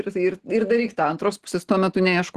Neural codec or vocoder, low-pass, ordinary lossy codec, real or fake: none; 14.4 kHz; Opus, 16 kbps; real